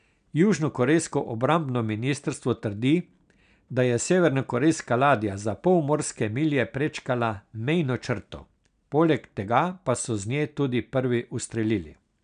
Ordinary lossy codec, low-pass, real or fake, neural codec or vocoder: none; 9.9 kHz; real; none